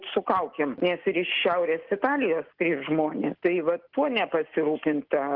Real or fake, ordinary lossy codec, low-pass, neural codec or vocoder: real; Opus, 16 kbps; 5.4 kHz; none